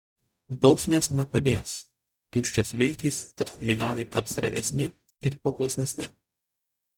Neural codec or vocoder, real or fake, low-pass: codec, 44.1 kHz, 0.9 kbps, DAC; fake; 19.8 kHz